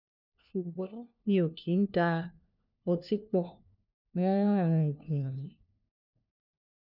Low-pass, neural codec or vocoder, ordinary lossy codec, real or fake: 5.4 kHz; codec, 16 kHz, 1 kbps, FunCodec, trained on LibriTTS, 50 frames a second; none; fake